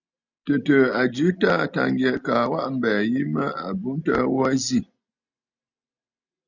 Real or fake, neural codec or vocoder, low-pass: real; none; 7.2 kHz